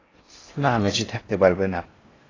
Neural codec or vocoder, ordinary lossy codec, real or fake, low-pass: codec, 16 kHz in and 24 kHz out, 0.6 kbps, FocalCodec, streaming, 4096 codes; AAC, 32 kbps; fake; 7.2 kHz